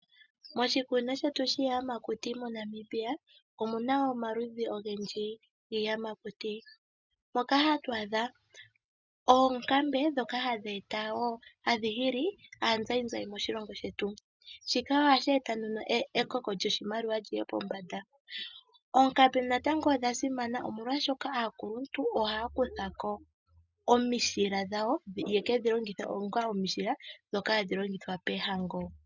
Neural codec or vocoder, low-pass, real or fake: none; 7.2 kHz; real